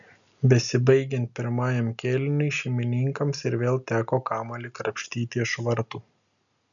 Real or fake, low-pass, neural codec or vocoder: real; 7.2 kHz; none